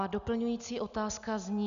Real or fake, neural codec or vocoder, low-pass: real; none; 7.2 kHz